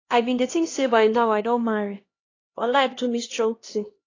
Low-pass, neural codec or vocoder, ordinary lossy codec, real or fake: 7.2 kHz; codec, 16 kHz, 1 kbps, X-Codec, HuBERT features, trained on LibriSpeech; AAC, 32 kbps; fake